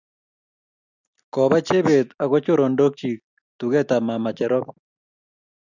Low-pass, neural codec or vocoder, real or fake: 7.2 kHz; none; real